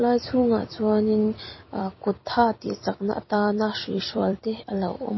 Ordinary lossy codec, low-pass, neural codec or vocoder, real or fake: MP3, 24 kbps; 7.2 kHz; vocoder, 44.1 kHz, 128 mel bands every 256 samples, BigVGAN v2; fake